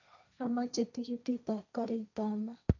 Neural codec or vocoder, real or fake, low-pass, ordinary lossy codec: codec, 16 kHz, 1.1 kbps, Voila-Tokenizer; fake; 7.2 kHz; none